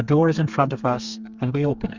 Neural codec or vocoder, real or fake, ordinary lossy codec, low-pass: codec, 32 kHz, 1.9 kbps, SNAC; fake; Opus, 64 kbps; 7.2 kHz